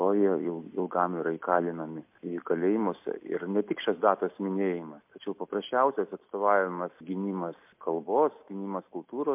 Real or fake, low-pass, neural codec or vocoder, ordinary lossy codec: real; 3.6 kHz; none; MP3, 32 kbps